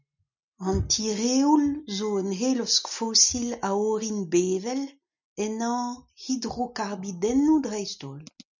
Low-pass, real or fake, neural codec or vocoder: 7.2 kHz; real; none